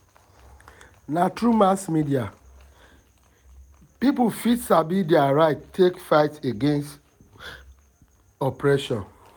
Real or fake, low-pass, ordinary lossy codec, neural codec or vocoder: real; none; none; none